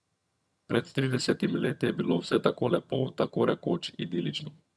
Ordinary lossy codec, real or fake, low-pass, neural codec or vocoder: none; fake; none; vocoder, 22.05 kHz, 80 mel bands, HiFi-GAN